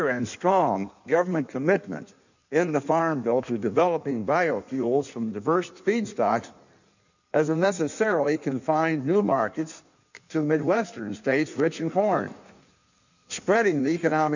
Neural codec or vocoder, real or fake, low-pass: codec, 16 kHz in and 24 kHz out, 1.1 kbps, FireRedTTS-2 codec; fake; 7.2 kHz